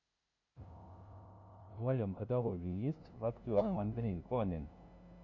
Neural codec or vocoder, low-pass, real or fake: codec, 16 kHz, 0.5 kbps, FunCodec, trained on LibriTTS, 25 frames a second; 7.2 kHz; fake